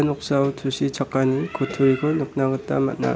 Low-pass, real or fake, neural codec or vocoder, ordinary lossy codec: none; real; none; none